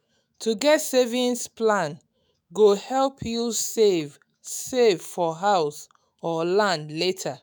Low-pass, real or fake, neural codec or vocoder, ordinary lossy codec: none; fake; autoencoder, 48 kHz, 128 numbers a frame, DAC-VAE, trained on Japanese speech; none